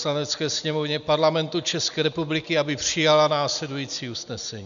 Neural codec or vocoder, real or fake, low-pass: none; real; 7.2 kHz